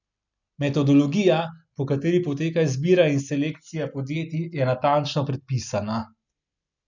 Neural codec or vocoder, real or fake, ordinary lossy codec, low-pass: none; real; none; 7.2 kHz